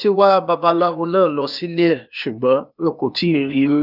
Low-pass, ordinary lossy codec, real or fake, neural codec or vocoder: 5.4 kHz; none; fake; codec, 16 kHz, 0.8 kbps, ZipCodec